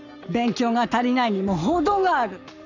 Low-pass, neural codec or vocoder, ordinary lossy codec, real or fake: 7.2 kHz; codec, 44.1 kHz, 7.8 kbps, Pupu-Codec; none; fake